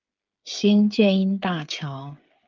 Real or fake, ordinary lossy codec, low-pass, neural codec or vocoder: fake; Opus, 24 kbps; 7.2 kHz; codec, 16 kHz, 8 kbps, FreqCodec, smaller model